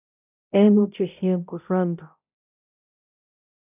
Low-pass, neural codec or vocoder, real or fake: 3.6 kHz; codec, 16 kHz, 0.5 kbps, X-Codec, HuBERT features, trained on balanced general audio; fake